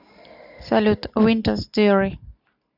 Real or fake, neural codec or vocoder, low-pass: real; none; 5.4 kHz